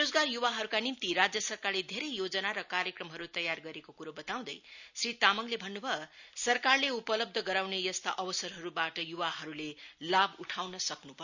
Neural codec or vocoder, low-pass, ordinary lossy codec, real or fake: none; 7.2 kHz; none; real